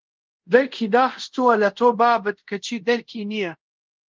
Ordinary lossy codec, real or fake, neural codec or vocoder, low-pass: Opus, 32 kbps; fake; codec, 24 kHz, 0.5 kbps, DualCodec; 7.2 kHz